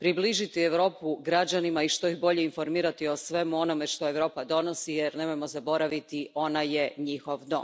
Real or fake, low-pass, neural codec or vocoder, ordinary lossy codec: real; none; none; none